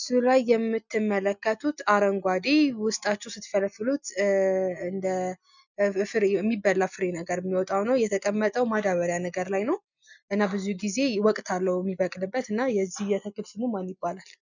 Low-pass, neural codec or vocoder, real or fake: 7.2 kHz; none; real